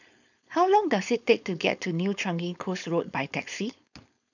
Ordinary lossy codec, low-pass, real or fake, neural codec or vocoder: none; 7.2 kHz; fake; codec, 16 kHz, 4.8 kbps, FACodec